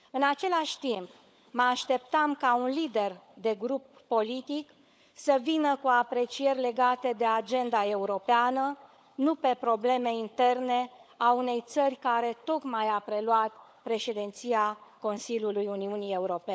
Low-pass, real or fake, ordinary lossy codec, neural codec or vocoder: none; fake; none; codec, 16 kHz, 16 kbps, FunCodec, trained on LibriTTS, 50 frames a second